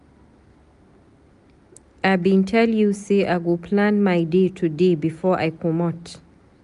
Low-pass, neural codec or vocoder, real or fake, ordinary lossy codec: 10.8 kHz; none; real; none